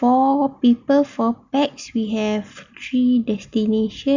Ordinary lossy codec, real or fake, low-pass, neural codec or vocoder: none; real; 7.2 kHz; none